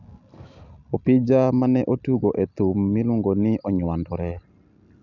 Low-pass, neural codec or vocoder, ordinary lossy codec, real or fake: 7.2 kHz; none; none; real